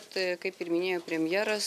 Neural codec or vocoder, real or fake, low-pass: none; real; 14.4 kHz